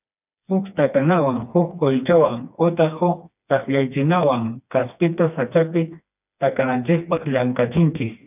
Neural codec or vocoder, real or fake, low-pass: codec, 16 kHz, 2 kbps, FreqCodec, smaller model; fake; 3.6 kHz